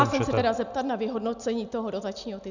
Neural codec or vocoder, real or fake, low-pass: none; real; 7.2 kHz